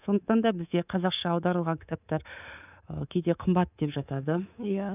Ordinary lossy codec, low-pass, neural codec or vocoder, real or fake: none; 3.6 kHz; codec, 24 kHz, 3.1 kbps, DualCodec; fake